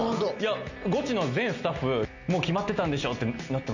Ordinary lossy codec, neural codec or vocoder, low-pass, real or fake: none; none; 7.2 kHz; real